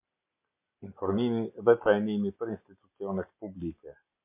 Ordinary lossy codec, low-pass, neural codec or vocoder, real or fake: MP3, 32 kbps; 3.6 kHz; none; real